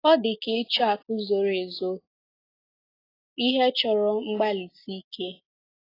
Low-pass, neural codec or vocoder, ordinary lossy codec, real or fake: 5.4 kHz; none; AAC, 24 kbps; real